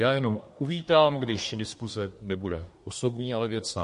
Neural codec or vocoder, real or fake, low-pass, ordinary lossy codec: codec, 24 kHz, 1 kbps, SNAC; fake; 10.8 kHz; MP3, 48 kbps